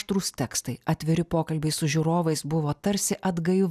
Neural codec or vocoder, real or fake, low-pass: none; real; 14.4 kHz